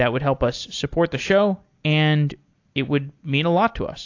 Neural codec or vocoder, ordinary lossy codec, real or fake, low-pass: none; AAC, 48 kbps; real; 7.2 kHz